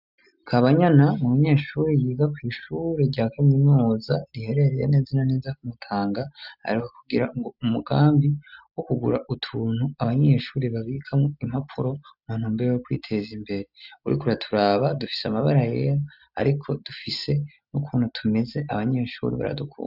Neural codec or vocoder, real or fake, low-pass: none; real; 5.4 kHz